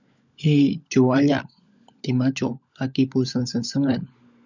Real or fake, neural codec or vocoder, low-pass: fake; codec, 16 kHz, 16 kbps, FunCodec, trained on LibriTTS, 50 frames a second; 7.2 kHz